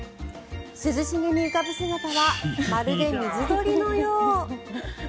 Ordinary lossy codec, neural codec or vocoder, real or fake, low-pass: none; none; real; none